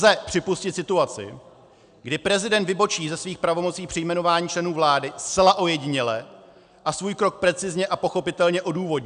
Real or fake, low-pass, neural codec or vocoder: real; 9.9 kHz; none